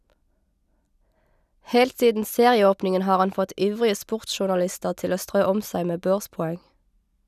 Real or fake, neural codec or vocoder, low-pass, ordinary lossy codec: real; none; 14.4 kHz; none